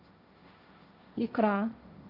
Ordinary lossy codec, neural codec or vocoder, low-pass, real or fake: Opus, 64 kbps; codec, 16 kHz, 1.1 kbps, Voila-Tokenizer; 5.4 kHz; fake